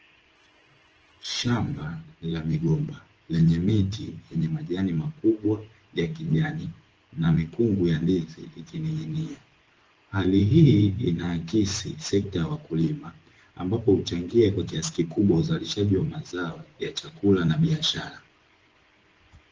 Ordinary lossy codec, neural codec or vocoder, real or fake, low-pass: Opus, 16 kbps; vocoder, 24 kHz, 100 mel bands, Vocos; fake; 7.2 kHz